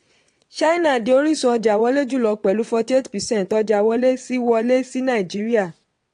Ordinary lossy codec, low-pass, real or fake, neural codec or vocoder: AAC, 48 kbps; 9.9 kHz; fake; vocoder, 22.05 kHz, 80 mel bands, WaveNeXt